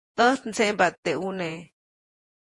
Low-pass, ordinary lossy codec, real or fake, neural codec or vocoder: 10.8 kHz; MP3, 48 kbps; fake; vocoder, 48 kHz, 128 mel bands, Vocos